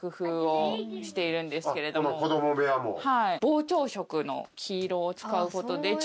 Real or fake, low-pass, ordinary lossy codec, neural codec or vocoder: real; none; none; none